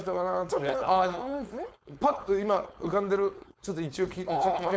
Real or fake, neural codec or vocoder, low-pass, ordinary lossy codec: fake; codec, 16 kHz, 4.8 kbps, FACodec; none; none